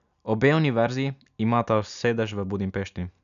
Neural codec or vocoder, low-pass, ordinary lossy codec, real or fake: none; 7.2 kHz; none; real